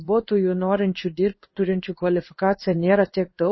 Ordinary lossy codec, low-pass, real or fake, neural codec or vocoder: MP3, 24 kbps; 7.2 kHz; fake; codec, 16 kHz in and 24 kHz out, 1 kbps, XY-Tokenizer